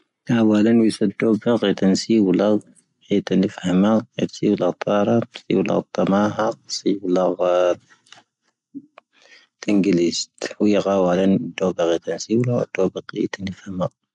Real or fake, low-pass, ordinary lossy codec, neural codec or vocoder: real; 10.8 kHz; none; none